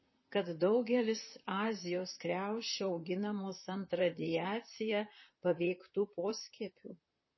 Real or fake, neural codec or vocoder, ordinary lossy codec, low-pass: fake; vocoder, 44.1 kHz, 128 mel bands, Pupu-Vocoder; MP3, 24 kbps; 7.2 kHz